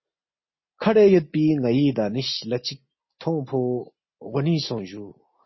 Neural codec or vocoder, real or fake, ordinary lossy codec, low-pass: none; real; MP3, 24 kbps; 7.2 kHz